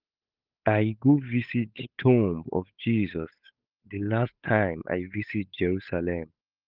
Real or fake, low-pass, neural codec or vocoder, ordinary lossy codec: fake; 5.4 kHz; codec, 16 kHz, 8 kbps, FunCodec, trained on Chinese and English, 25 frames a second; Opus, 24 kbps